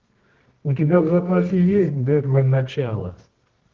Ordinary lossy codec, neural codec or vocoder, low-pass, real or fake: Opus, 16 kbps; codec, 24 kHz, 0.9 kbps, WavTokenizer, medium music audio release; 7.2 kHz; fake